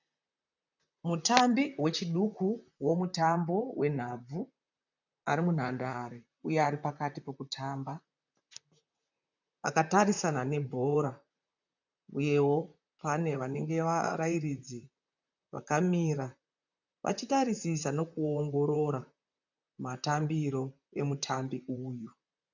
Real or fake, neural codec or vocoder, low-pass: fake; vocoder, 44.1 kHz, 128 mel bands, Pupu-Vocoder; 7.2 kHz